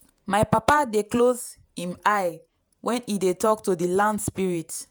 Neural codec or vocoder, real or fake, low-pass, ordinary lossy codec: vocoder, 48 kHz, 128 mel bands, Vocos; fake; none; none